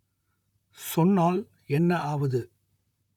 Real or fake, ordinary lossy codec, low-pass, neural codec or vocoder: fake; none; 19.8 kHz; vocoder, 44.1 kHz, 128 mel bands, Pupu-Vocoder